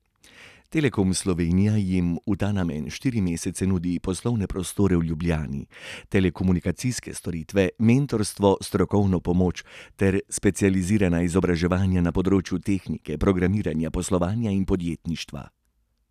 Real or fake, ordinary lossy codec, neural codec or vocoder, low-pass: real; none; none; 14.4 kHz